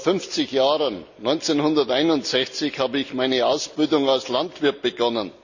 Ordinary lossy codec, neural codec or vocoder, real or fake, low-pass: Opus, 64 kbps; none; real; 7.2 kHz